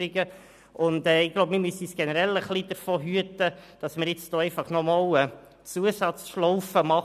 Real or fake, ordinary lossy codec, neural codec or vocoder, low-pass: real; none; none; 14.4 kHz